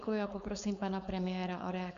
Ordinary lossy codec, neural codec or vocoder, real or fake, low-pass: Opus, 64 kbps; codec, 16 kHz, 4.8 kbps, FACodec; fake; 7.2 kHz